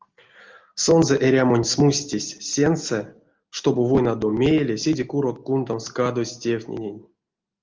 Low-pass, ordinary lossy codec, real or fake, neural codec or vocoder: 7.2 kHz; Opus, 32 kbps; real; none